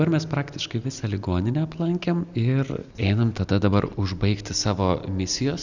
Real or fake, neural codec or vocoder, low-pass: real; none; 7.2 kHz